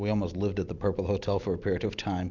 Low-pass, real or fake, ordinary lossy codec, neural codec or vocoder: 7.2 kHz; real; Opus, 64 kbps; none